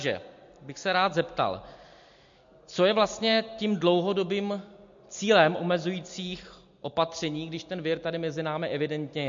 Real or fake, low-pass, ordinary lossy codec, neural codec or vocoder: real; 7.2 kHz; MP3, 48 kbps; none